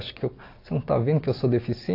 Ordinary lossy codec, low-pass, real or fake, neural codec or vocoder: AAC, 32 kbps; 5.4 kHz; real; none